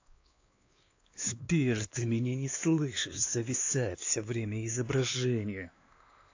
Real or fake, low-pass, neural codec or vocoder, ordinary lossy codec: fake; 7.2 kHz; codec, 16 kHz, 2 kbps, X-Codec, WavLM features, trained on Multilingual LibriSpeech; AAC, 48 kbps